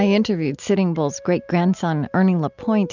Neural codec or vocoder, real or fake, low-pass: none; real; 7.2 kHz